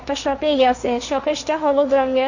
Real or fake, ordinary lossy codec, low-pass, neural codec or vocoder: fake; none; 7.2 kHz; codec, 16 kHz, 1.1 kbps, Voila-Tokenizer